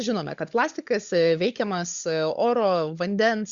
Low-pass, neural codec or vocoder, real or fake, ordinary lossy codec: 7.2 kHz; codec, 16 kHz, 16 kbps, FunCodec, trained on LibriTTS, 50 frames a second; fake; Opus, 64 kbps